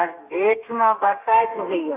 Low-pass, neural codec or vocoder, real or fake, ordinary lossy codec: 3.6 kHz; codec, 32 kHz, 1.9 kbps, SNAC; fake; AAC, 24 kbps